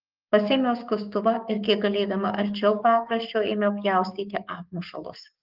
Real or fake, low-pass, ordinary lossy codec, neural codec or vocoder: fake; 5.4 kHz; Opus, 32 kbps; codec, 44.1 kHz, 7.8 kbps, DAC